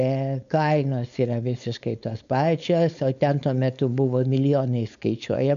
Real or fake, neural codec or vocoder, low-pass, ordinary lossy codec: fake; codec, 16 kHz, 4.8 kbps, FACodec; 7.2 kHz; MP3, 96 kbps